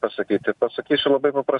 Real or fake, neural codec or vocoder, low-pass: real; none; 10.8 kHz